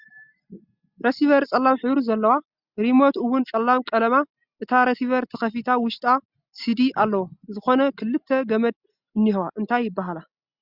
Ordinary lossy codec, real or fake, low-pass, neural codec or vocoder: Opus, 64 kbps; real; 5.4 kHz; none